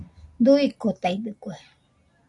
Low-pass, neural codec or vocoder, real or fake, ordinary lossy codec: 10.8 kHz; none; real; AAC, 48 kbps